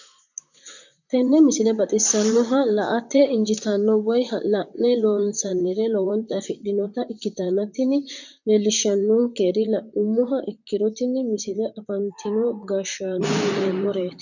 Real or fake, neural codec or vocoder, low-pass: fake; vocoder, 44.1 kHz, 128 mel bands, Pupu-Vocoder; 7.2 kHz